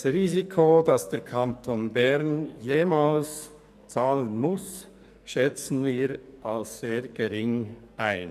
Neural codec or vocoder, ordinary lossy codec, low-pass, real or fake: codec, 44.1 kHz, 2.6 kbps, SNAC; none; 14.4 kHz; fake